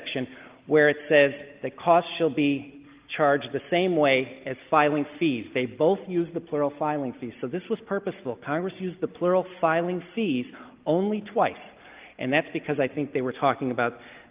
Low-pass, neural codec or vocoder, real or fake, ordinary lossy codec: 3.6 kHz; none; real; Opus, 24 kbps